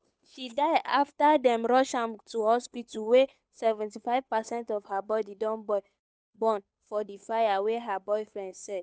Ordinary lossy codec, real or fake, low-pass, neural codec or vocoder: none; fake; none; codec, 16 kHz, 8 kbps, FunCodec, trained on Chinese and English, 25 frames a second